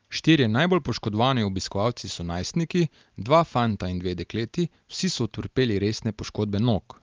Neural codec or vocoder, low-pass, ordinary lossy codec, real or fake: none; 7.2 kHz; Opus, 32 kbps; real